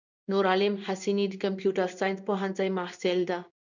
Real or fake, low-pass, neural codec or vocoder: fake; 7.2 kHz; codec, 16 kHz in and 24 kHz out, 1 kbps, XY-Tokenizer